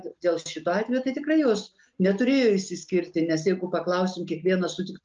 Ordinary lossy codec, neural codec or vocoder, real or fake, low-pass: Opus, 24 kbps; none; real; 7.2 kHz